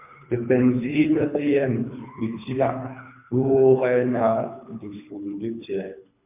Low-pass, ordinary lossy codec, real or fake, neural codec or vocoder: 3.6 kHz; MP3, 32 kbps; fake; codec, 24 kHz, 3 kbps, HILCodec